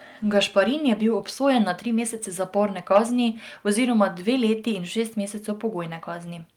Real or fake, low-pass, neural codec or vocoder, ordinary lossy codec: real; 19.8 kHz; none; Opus, 32 kbps